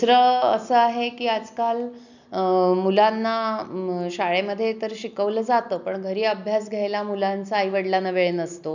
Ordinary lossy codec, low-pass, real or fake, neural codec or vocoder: none; 7.2 kHz; real; none